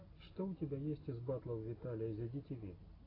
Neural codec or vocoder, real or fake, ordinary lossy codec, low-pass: none; real; AAC, 24 kbps; 5.4 kHz